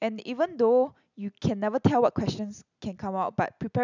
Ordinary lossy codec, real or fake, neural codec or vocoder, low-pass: none; real; none; 7.2 kHz